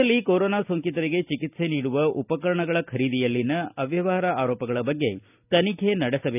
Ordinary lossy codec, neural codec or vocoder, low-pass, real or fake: none; none; 3.6 kHz; real